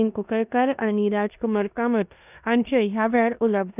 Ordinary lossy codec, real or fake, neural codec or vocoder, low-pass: none; fake; codec, 16 kHz in and 24 kHz out, 0.9 kbps, LongCat-Audio-Codec, four codebook decoder; 3.6 kHz